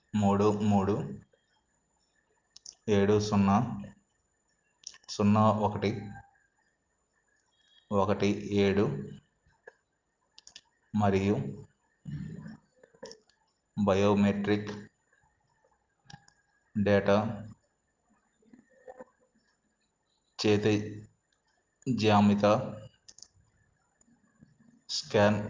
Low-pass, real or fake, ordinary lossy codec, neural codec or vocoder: 7.2 kHz; real; Opus, 24 kbps; none